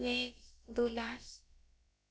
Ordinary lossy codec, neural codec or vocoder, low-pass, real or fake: none; codec, 16 kHz, about 1 kbps, DyCAST, with the encoder's durations; none; fake